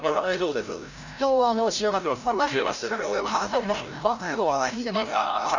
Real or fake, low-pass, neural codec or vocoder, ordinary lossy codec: fake; 7.2 kHz; codec, 16 kHz, 0.5 kbps, FreqCodec, larger model; none